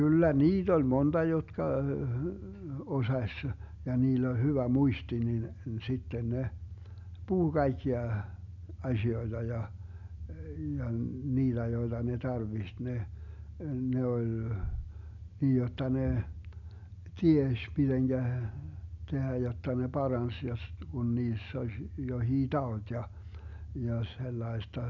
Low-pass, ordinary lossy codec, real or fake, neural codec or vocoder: 7.2 kHz; none; real; none